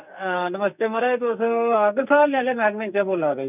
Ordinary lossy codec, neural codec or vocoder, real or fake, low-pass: none; codec, 44.1 kHz, 2.6 kbps, SNAC; fake; 3.6 kHz